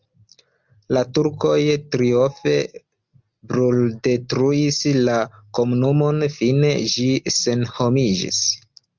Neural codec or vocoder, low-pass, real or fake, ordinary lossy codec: none; 7.2 kHz; real; Opus, 32 kbps